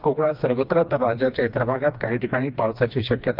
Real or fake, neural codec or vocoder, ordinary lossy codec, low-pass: fake; codec, 16 kHz, 2 kbps, FreqCodec, smaller model; Opus, 32 kbps; 5.4 kHz